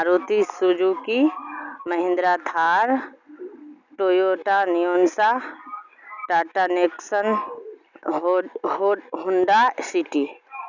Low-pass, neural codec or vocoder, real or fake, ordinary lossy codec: 7.2 kHz; none; real; none